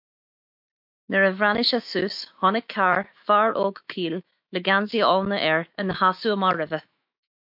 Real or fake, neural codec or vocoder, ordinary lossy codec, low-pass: fake; autoencoder, 48 kHz, 128 numbers a frame, DAC-VAE, trained on Japanese speech; MP3, 48 kbps; 5.4 kHz